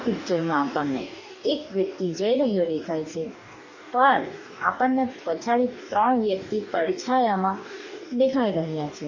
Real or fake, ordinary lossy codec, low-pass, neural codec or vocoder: fake; none; 7.2 kHz; codec, 44.1 kHz, 2.6 kbps, DAC